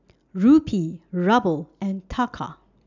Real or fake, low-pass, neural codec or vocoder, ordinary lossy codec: real; 7.2 kHz; none; none